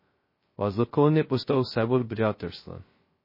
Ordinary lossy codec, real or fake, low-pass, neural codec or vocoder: MP3, 24 kbps; fake; 5.4 kHz; codec, 16 kHz, 0.2 kbps, FocalCodec